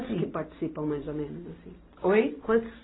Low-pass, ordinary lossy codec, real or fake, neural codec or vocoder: 7.2 kHz; AAC, 16 kbps; real; none